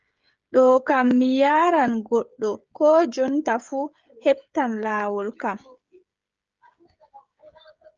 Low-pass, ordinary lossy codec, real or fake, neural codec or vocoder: 7.2 kHz; Opus, 24 kbps; fake; codec, 16 kHz, 16 kbps, FreqCodec, smaller model